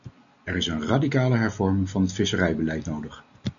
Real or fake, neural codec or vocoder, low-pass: real; none; 7.2 kHz